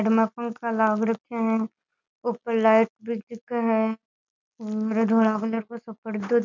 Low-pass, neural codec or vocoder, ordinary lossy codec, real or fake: 7.2 kHz; none; none; real